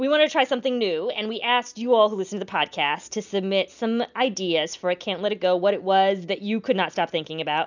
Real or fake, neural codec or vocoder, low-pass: real; none; 7.2 kHz